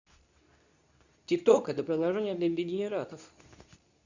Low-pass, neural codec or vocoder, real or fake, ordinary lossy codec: 7.2 kHz; codec, 24 kHz, 0.9 kbps, WavTokenizer, medium speech release version 2; fake; none